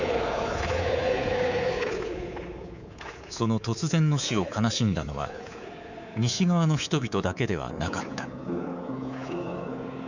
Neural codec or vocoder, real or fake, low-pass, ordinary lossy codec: codec, 24 kHz, 3.1 kbps, DualCodec; fake; 7.2 kHz; none